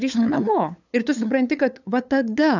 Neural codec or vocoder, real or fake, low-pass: codec, 16 kHz, 8 kbps, FunCodec, trained on LibriTTS, 25 frames a second; fake; 7.2 kHz